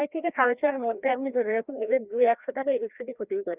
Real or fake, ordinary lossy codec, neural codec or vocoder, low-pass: fake; Opus, 64 kbps; codec, 16 kHz, 1 kbps, FreqCodec, larger model; 3.6 kHz